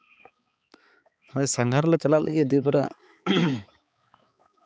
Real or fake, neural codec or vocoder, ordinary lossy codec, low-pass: fake; codec, 16 kHz, 4 kbps, X-Codec, HuBERT features, trained on balanced general audio; none; none